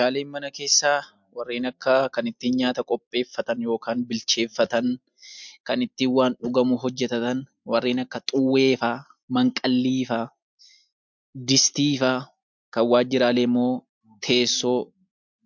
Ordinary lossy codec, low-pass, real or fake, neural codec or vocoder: MP3, 64 kbps; 7.2 kHz; real; none